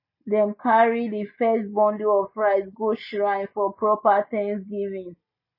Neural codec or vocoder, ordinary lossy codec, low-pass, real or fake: none; MP3, 24 kbps; 5.4 kHz; real